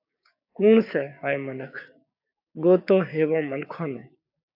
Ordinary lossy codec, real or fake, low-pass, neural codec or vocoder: AAC, 48 kbps; fake; 5.4 kHz; codec, 16 kHz, 6 kbps, DAC